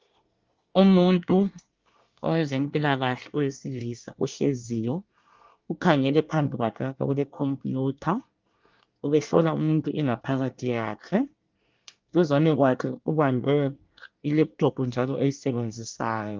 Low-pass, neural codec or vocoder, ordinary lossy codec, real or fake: 7.2 kHz; codec, 24 kHz, 1 kbps, SNAC; Opus, 32 kbps; fake